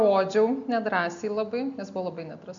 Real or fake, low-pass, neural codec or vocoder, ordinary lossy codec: real; 7.2 kHz; none; MP3, 64 kbps